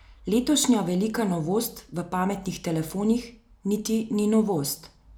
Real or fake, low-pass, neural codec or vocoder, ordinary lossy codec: real; none; none; none